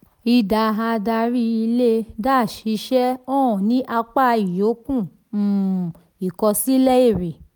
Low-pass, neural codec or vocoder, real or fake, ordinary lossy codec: none; none; real; none